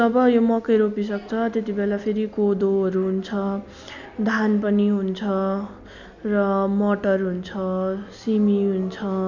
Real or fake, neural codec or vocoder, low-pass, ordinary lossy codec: real; none; 7.2 kHz; MP3, 64 kbps